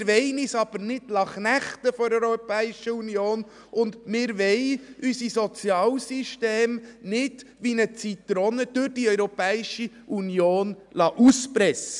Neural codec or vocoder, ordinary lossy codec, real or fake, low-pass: none; none; real; 10.8 kHz